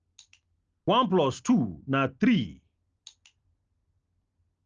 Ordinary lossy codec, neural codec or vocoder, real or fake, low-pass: Opus, 24 kbps; none; real; 7.2 kHz